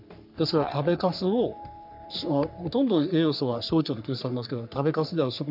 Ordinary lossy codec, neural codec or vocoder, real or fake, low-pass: none; codec, 44.1 kHz, 3.4 kbps, Pupu-Codec; fake; 5.4 kHz